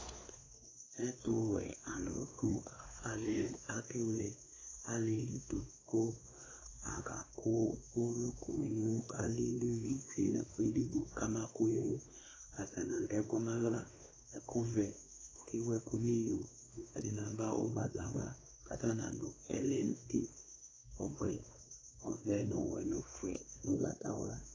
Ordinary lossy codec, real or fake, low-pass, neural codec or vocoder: AAC, 32 kbps; fake; 7.2 kHz; codec, 16 kHz, 2 kbps, X-Codec, WavLM features, trained on Multilingual LibriSpeech